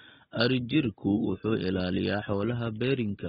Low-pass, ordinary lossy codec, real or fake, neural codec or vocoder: 7.2 kHz; AAC, 16 kbps; real; none